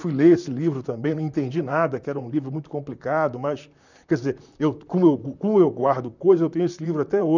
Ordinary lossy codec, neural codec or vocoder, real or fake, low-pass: none; vocoder, 44.1 kHz, 128 mel bands, Pupu-Vocoder; fake; 7.2 kHz